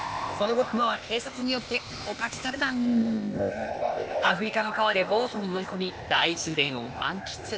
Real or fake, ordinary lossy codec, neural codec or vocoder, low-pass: fake; none; codec, 16 kHz, 0.8 kbps, ZipCodec; none